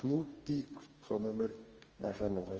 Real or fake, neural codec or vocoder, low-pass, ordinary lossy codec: fake; codec, 44.1 kHz, 2.6 kbps, DAC; 7.2 kHz; Opus, 24 kbps